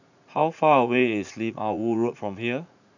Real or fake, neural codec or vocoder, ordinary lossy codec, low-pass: fake; vocoder, 44.1 kHz, 80 mel bands, Vocos; none; 7.2 kHz